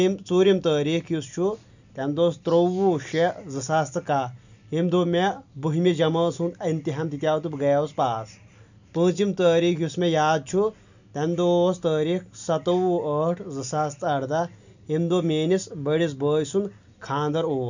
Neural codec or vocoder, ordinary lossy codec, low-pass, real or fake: none; none; 7.2 kHz; real